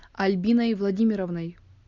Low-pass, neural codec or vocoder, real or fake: 7.2 kHz; none; real